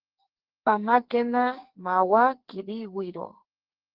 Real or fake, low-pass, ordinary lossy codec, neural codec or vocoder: fake; 5.4 kHz; Opus, 16 kbps; codec, 44.1 kHz, 2.6 kbps, SNAC